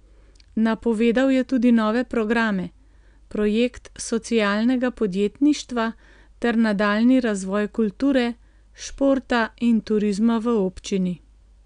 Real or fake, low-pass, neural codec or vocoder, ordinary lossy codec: real; 9.9 kHz; none; none